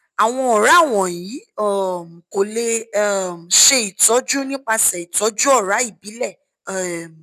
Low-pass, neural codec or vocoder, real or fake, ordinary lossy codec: 14.4 kHz; none; real; none